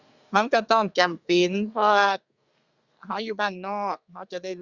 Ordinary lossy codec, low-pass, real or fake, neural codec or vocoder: Opus, 64 kbps; 7.2 kHz; fake; codec, 32 kHz, 1.9 kbps, SNAC